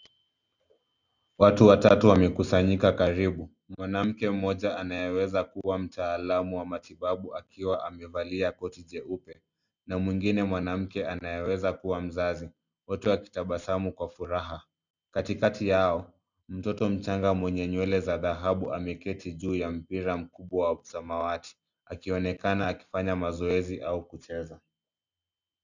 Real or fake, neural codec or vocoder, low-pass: real; none; 7.2 kHz